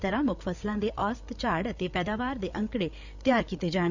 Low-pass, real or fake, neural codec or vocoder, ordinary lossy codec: 7.2 kHz; fake; codec, 16 kHz, 8 kbps, FreqCodec, larger model; none